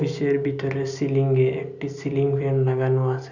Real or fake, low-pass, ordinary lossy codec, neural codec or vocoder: real; 7.2 kHz; none; none